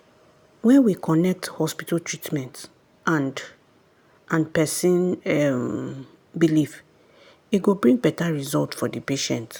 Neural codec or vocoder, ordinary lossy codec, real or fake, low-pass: none; none; real; none